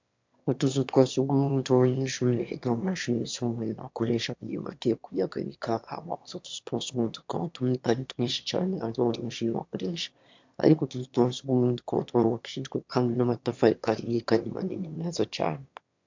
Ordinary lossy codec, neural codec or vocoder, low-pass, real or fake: MP3, 64 kbps; autoencoder, 22.05 kHz, a latent of 192 numbers a frame, VITS, trained on one speaker; 7.2 kHz; fake